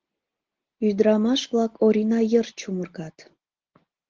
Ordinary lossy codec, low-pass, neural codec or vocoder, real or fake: Opus, 16 kbps; 7.2 kHz; none; real